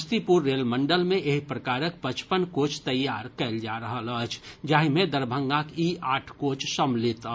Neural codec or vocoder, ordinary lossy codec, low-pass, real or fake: none; none; none; real